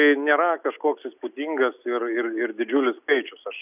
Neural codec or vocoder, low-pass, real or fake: none; 3.6 kHz; real